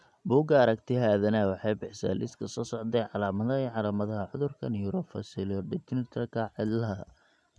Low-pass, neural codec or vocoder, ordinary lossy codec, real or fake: none; none; none; real